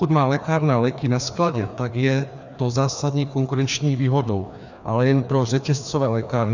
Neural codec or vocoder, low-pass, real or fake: codec, 16 kHz, 2 kbps, FreqCodec, larger model; 7.2 kHz; fake